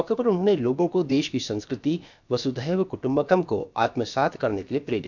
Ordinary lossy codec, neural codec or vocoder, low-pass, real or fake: none; codec, 16 kHz, about 1 kbps, DyCAST, with the encoder's durations; 7.2 kHz; fake